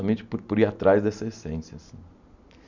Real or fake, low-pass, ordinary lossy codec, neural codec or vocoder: real; 7.2 kHz; none; none